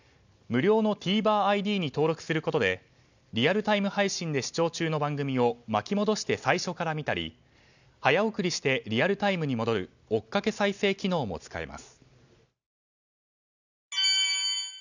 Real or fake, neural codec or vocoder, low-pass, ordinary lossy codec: real; none; 7.2 kHz; none